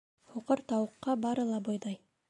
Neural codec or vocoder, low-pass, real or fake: none; 10.8 kHz; real